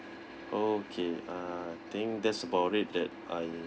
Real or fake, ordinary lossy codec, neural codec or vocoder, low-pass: real; none; none; none